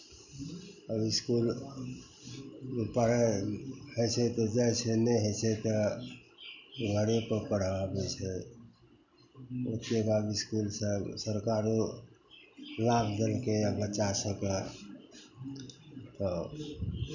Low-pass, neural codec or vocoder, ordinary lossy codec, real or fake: 7.2 kHz; none; none; real